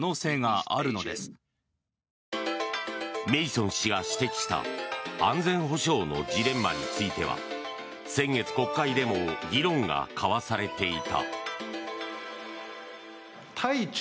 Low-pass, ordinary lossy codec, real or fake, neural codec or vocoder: none; none; real; none